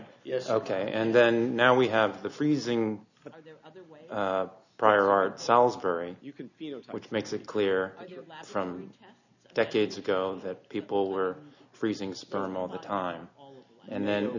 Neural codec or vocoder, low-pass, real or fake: none; 7.2 kHz; real